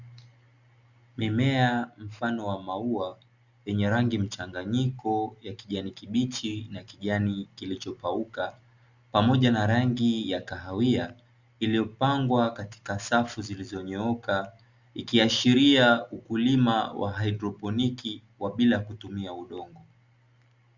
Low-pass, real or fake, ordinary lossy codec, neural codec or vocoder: 7.2 kHz; real; Opus, 64 kbps; none